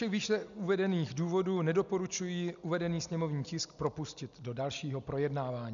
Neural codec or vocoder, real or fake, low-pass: none; real; 7.2 kHz